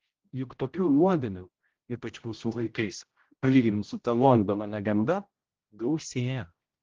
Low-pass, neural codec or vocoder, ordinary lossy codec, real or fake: 7.2 kHz; codec, 16 kHz, 0.5 kbps, X-Codec, HuBERT features, trained on general audio; Opus, 16 kbps; fake